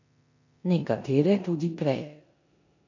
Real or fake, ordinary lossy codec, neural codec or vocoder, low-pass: fake; none; codec, 16 kHz in and 24 kHz out, 0.9 kbps, LongCat-Audio-Codec, four codebook decoder; 7.2 kHz